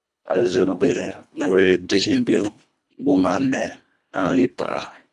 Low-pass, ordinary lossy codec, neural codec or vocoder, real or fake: none; none; codec, 24 kHz, 1.5 kbps, HILCodec; fake